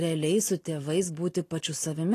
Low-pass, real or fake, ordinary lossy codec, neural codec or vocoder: 14.4 kHz; real; AAC, 48 kbps; none